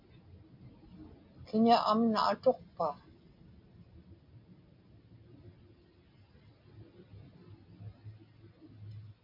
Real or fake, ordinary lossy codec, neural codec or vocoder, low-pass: real; MP3, 48 kbps; none; 5.4 kHz